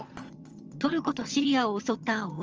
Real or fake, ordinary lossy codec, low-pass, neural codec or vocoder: fake; Opus, 24 kbps; 7.2 kHz; vocoder, 22.05 kHz, 80 mel bands, HiFi-GAN